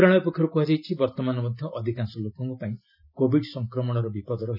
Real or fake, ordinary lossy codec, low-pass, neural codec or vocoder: real; none; 5.4 kHz; none